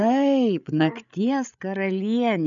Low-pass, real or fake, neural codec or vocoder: 7.2 kHz; fake; codec, 16 kHz, 8 kbps, FreqCodec, larger model